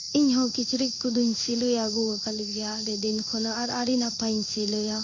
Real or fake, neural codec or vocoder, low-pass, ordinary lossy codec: fake; codec, 16 kHz in and 24 kHz out, 1 kbps, XY-Tokenizer; 7.2 kHz; MP3, 48 kbps